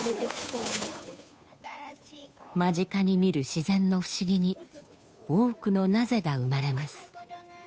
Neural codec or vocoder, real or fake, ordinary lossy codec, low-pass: codec, 16 kHz, 2 kbps, FunCodec, trained on Chinese and English, 25 frames a second; fake; none; none